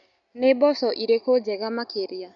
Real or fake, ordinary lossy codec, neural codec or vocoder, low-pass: real; none; none; 7.2 kHz